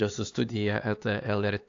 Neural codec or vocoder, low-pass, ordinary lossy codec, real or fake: codec, 16 kHz, 4 kbps, X-Codec, HuBERT features, trained on LibriSpeech; 7.2 kHz; AAC, 48 kbps; fake